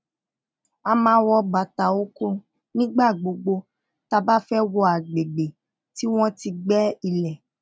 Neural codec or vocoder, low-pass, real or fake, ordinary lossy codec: none; none; real; none